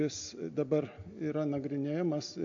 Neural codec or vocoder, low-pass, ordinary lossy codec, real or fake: none; 7.2 kHz; AAC, 64 kbps; real